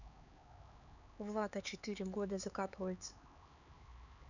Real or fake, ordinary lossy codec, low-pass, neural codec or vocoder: fake; none; 7.2 kHz; codec, 16 kHz, 4 kbps, X-Codec, HuBERT features, trained on LibriSpeech